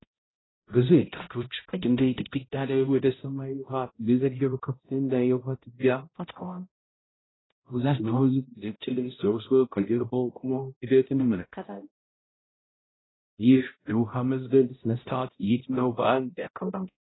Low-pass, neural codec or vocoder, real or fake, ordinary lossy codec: 7.2 kHz; codec, 16 kHz, 0.5 kbps, X-Codec, HuBERT features, trained on balanced general audio; fake; AAC, 16 kbps